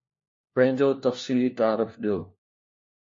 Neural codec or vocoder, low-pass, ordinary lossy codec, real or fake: codec, 16 kHz, 1 kbps, FunCodec, trained on LibriTTS, 50 frames a second; 7.2 kHz; MP3, 32 kbps; fake